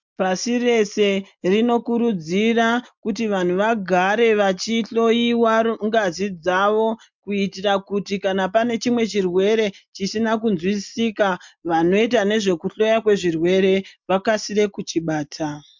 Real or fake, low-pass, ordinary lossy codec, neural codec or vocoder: real; 7.2 kHz; MP3, 64 kbps; none